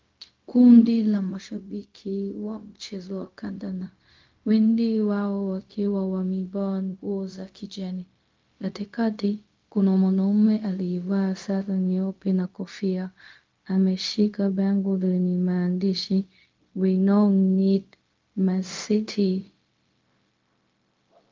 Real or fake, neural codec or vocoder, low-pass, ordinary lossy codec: fake; codec, 16 kHz, 0.4 kbps, LongCat-Audio-Codec; 7.2 kHz; Opus, 32 kbps